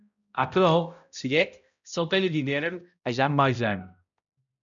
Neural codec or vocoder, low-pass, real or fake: codec, 16 kHz, 0.5 kbps, X-Codec, HuBERT features, trained on balanced general audio; 7.2 kHz; fake